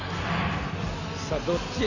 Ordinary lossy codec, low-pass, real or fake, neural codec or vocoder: none; 7.2 kHz; real; none